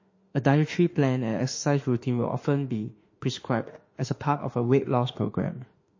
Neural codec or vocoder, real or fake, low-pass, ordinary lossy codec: autoencoder, 48 kHz, 32 numbers a frame, DAC-VAE, trained on Japanese speech; fake; 7.2 kHz; MP3, 32 kbps